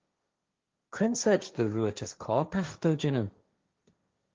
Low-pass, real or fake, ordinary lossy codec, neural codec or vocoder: 7.2 kHz; fake; Opus, 32 kbps; codec, 16 kHz, 1.1 kbps, Voila-Tokenizer